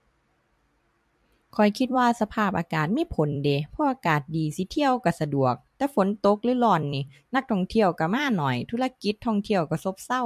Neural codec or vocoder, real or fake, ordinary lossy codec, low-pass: vocoder, 44.1 kHz, 128 mel bands every 256 samples, BigVGAN v2; fake; MP3, 64 kbps; 14.4 kHz